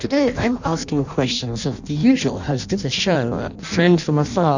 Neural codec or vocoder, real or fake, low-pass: codec, 16 kHz in and 24 kHz out, 0.6 kbps, FireRedTTS-2 codec; fake; 7.2 kHz